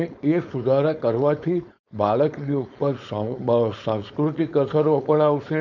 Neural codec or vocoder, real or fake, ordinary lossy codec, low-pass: codec, 16 kHz, 4.8 kbps, FACodec; fake; none; 7.2 kHz